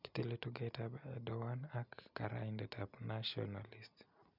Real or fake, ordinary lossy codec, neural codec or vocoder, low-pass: real; none; none; 5.4 kHz